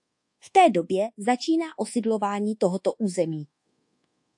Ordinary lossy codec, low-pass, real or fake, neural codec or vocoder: AAC, 64 kbps; 10.8 kHz; fake; codec, 24 kHz, 1.2 kbps, DualCodec